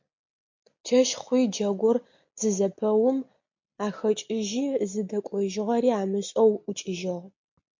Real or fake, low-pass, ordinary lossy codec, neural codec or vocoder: real; 7.2 kHz; MP3, 48 kbps; none